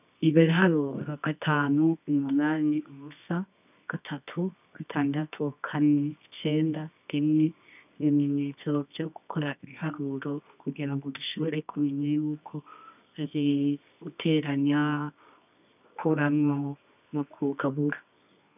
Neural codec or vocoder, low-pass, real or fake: codec, 24 kHz, 0.9 kbps, WavTokenizer, medium music audio release; 3.6 kHz; fake